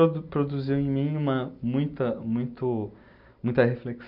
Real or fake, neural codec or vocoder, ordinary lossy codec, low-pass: real; none; none; 5.4 kHz